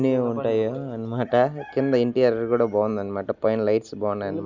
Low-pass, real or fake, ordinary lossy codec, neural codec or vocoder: 7.2 kHz; real; Opus, 64 kbps; none